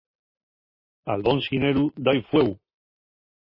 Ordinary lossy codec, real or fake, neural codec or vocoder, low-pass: MP3, 24 kbps; real; none; 5.4 kHz